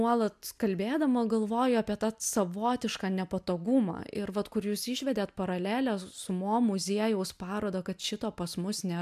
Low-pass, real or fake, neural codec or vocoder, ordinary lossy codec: 14.4 kHz; real; none; MP3, 96 kbps